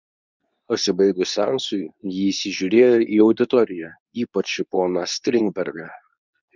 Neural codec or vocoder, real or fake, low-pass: codec, 24 kHz, 0.9 kbps, WavTokenizer, medium speech release version 2; fake; 7.2 kHz